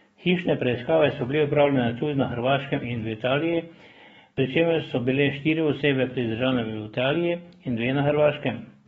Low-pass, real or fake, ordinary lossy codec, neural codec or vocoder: 19.8 kHz; fake; AAC, 24 kbps; codec, 44.1 kHz, 7.8 kbps, DAC